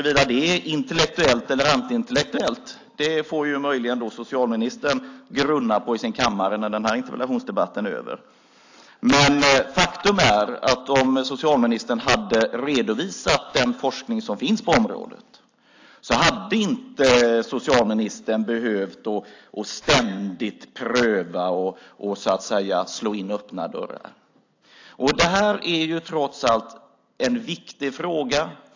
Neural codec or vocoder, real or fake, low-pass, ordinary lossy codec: none; real; 7.2 kHz; AAC, 48 kbps